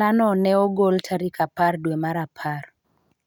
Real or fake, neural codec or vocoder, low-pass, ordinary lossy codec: real; none; 19.8 kHz; none